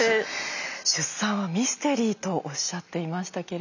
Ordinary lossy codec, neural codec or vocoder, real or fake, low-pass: none; none; real; 7.2 kHz